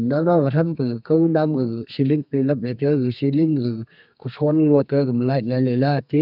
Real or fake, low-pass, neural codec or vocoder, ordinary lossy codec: fake; 5.4 kHz; codec, 32 kHz, 1.9 kbps, SNAC; none